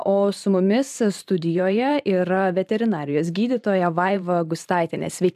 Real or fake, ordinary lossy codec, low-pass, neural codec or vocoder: fake; AAC, 96 kbps; 14.4 kHz; vocoder, 44.1 kHz, 128 mel bands every 512 samples, BigVGAN v2